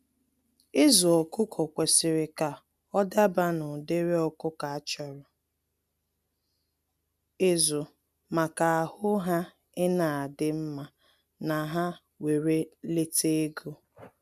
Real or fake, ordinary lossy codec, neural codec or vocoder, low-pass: real; none; none; 14.4 kHz